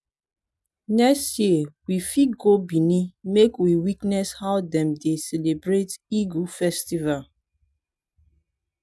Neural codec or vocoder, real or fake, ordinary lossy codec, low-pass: none; real; none; none